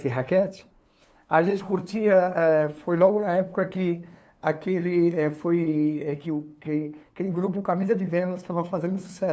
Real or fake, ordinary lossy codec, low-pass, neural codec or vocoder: fake; none; none; codec, 16 kHz, 2 kbps, FunCodec, trained on LibriTTS, 25 frames a second